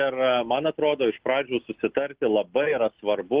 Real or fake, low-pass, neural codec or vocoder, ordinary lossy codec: real; 3.6 kHz; none; Opus, 16 kbps